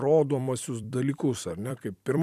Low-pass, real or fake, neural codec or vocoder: 14.4 kHz; fake; vocoder, 44.1 kHz, 128 mel bands every 256 samples, BigVGAN v2